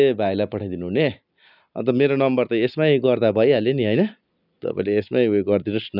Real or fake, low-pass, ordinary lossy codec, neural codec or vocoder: real; 5.4 kHz; none; none